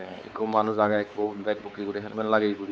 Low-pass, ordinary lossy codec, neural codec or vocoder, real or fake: none; none; codec, 16 kHz, 4 kbps, X-Codec, WavLM features, trained on Multilingual LibriSpeech; fake